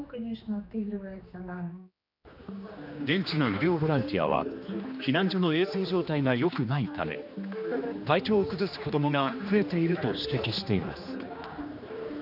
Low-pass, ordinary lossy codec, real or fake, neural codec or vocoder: 5.4 kHz; none; fake; codec, 16 kHz, 2 kbps, X-Codec, HuBERT features, trained on general audio